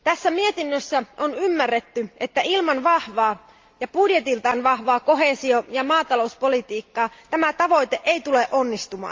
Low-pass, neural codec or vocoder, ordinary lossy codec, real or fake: 7.2 kHz; none; Opus, 32 kbps; real